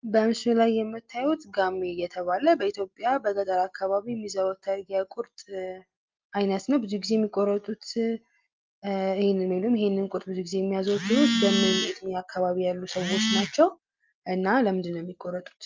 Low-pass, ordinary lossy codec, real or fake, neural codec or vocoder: 7.2 kHz; Opus, 32 kbps; real; none